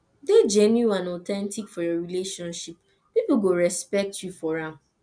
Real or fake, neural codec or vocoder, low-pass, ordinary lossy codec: real; none; 9.9 kHz; none